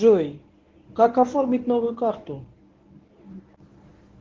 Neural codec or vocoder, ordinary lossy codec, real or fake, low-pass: codec, 24 kHz, 0.9 kbps, WavTokenizer, medium speech release version 1; Opus, 16 kbps; fake; 7.2 kHz